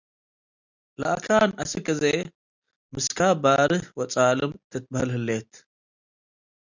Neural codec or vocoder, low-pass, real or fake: none; 7.2 kHz; real